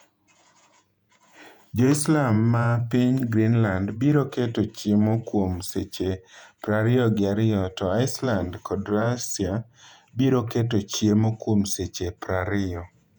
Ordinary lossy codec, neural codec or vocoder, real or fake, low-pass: none; vocoder, 48 kHz, 128 mel bands, Vocos; fake; 19.8 kHz